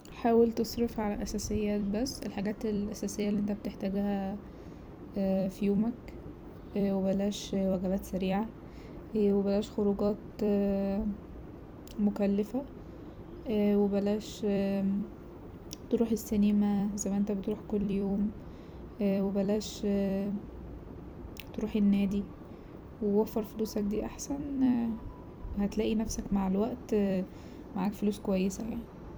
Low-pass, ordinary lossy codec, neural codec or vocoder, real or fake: none; none; vocoder, 44.1 kHz, 128 mel bands every 256 samples, BigVGAN v2; fake